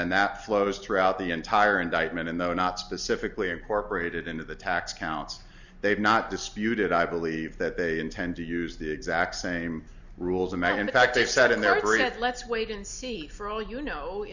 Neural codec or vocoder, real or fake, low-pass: none; real; 7.2 kHz